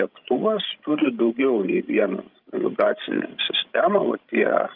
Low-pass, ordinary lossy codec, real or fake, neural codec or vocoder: 5.4 kHz; Opus, 24 kbps; fake; codec, 16 kHz, 16 kbps, FreqCodec, larger model